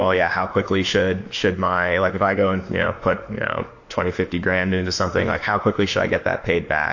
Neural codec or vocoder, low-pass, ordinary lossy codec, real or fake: autoencoder, 48 kHz, 32 numbers a frame, DAC-VAE, trained on Japanese speech; 7.2 kHz; AAC, 48 kbps; fake